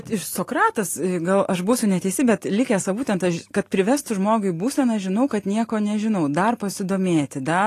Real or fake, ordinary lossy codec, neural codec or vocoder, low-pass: real; AAC, 48 kbps; none; 14.4 kHz